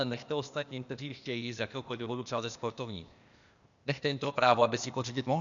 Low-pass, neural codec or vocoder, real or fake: 7.2 kHz; codec, 16 kHz, 0.8 kbps, ZipCodec; fake